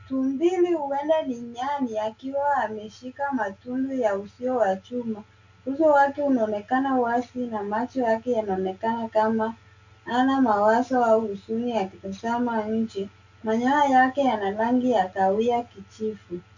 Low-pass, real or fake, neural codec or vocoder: 7.2 kHz; real; none